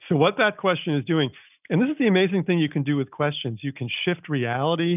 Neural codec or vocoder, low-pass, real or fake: none; 3.6 kHz; real